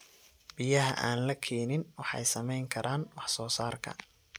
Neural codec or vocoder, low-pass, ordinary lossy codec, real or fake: none; none; none; real